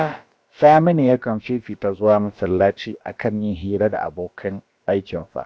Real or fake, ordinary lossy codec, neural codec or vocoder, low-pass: fake; none; codec, 16 kHz, about 1 kbps, DyCAST, with the encoder's durations; none